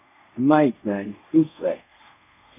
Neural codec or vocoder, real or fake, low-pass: codec, 24 kHz, 0.5 kbps, DualCodec; fake; 3.6 kHz